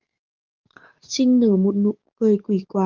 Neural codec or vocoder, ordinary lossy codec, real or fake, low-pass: codec, 44.1 kHz, 7.8 kbps, DAC; Opus, 32 kbps; fake; 7.2 kHz